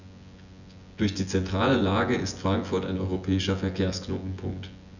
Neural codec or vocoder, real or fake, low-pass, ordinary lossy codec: vocoder, 24 kHz, 100 mel bands, Vocos; fake; 7.2 kHz; none